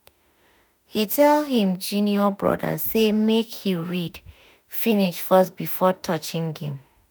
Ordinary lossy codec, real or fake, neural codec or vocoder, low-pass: none; fake; autoencoder, 48 kHz, 32 numbers a frame, DAC-VAE, trained on Japanese speech; none